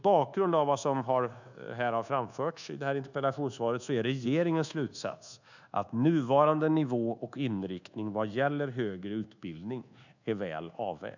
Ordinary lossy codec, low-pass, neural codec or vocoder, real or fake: none; 7.2 kHz; codec, 24 kHz, 1.2 kbps, DualCodec; fake